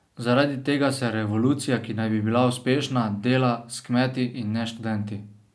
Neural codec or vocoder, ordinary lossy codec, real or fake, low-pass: none; none; real; none